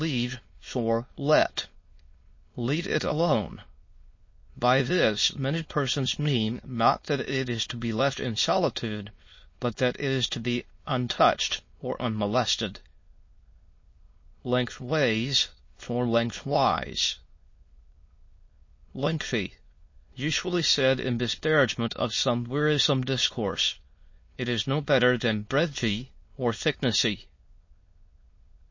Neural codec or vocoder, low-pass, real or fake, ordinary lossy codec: autoencoder, 22.05 kHz, a latent of 192 numbers a frame, VITS, trained on many speakers; 7.2 kHz; fake; MP3, 32 kbps